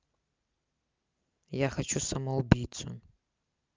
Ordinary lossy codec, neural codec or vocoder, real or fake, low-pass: Opus, 24 kbps; none; real; 7.2 kHz